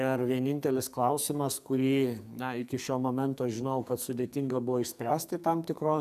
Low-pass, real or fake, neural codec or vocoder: 14.4 kHz; fake; codec, 44.1 kHz, 2.6 kbps, SNAC